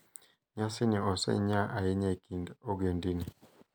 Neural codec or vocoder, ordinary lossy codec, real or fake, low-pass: none; none; real; none